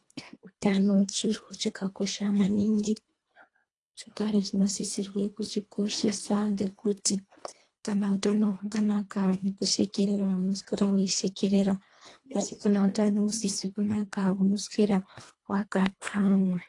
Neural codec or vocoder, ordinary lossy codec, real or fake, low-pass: codec, 24 kHz, 1.5 kbps, HILCodec; AAC, 48 kbps; fake; 10.8 kHz